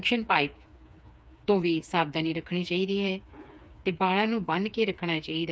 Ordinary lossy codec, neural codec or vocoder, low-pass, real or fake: none; codec, 16 kHz, 4 kbps, FreqCodec, smaller model; none; fake